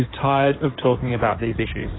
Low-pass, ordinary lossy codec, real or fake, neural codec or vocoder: 7.2 kHz; AAC, 16 kbps; fake; codec, 16 kHz in and 24 kHz out, 2.2 kbps, FireRedTTS-2 codec